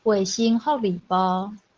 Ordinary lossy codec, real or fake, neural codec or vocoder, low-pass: Opus, 16 kbps; real; none; 7.2 kHz